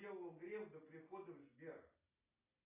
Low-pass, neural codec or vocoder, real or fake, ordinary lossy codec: 3.6 kHz; none; real; AAC, 16 kbps